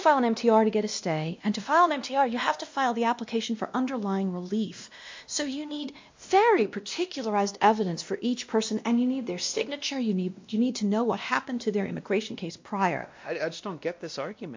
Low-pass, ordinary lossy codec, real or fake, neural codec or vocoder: 7.2 kHz; MP3, 48 kbps; fake; codec, 16 kHz, 1 kbps, X-Codec, WavLM features, trained on Multilingual LibriSpeech